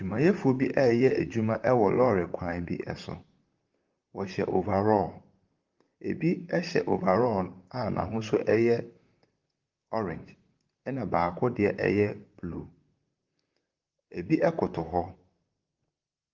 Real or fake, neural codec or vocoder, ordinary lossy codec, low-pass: fake; vocoder, 44.1 kHz, 128 mel bands, Pupu-Vocoder; Opus, 32 kbps; 7.2 kHz